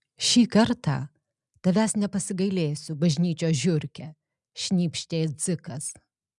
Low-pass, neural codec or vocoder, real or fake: 10.8 kHz; none; real